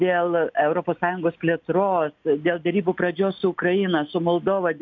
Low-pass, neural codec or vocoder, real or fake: 7.2 kHz; none; real